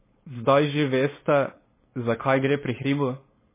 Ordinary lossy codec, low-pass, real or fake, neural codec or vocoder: MP3, 16 kbps; 3.6 kHz; fake; codec, 16 kHz, 4.8 kbps, FACodec